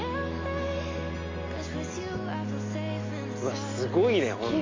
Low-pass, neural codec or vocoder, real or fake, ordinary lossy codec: 7.2 kHz; none; real; AAC, 32 kbps